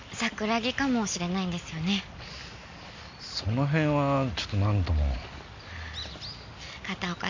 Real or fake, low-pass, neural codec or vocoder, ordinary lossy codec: real; 7.2 kHz; none; MP3, 48 kbps